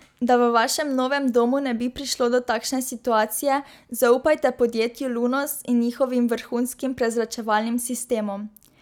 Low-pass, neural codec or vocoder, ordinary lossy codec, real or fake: 19.8 kHz; none; none; real